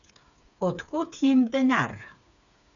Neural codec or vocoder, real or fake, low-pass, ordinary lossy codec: codec, 16 kHz, 4 kbps, FreqCodec, smaller model; fake; 7.2 kHz; none